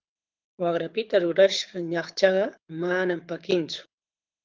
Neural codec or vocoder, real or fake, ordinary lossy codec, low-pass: codec, 24 kHz, 6 kbps, HILCodec; fake; Opus, 32 kbps; 7.2 kHz